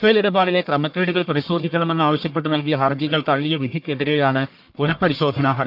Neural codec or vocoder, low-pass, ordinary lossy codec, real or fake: codec, 44.1 kHz, 1.7 kbps, Pupu-Codec; 5.4 kHz; AAC, 48 kbps; fake